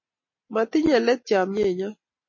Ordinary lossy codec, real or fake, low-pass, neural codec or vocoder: MP3, 32 kbps; real; 7.2 kHz; none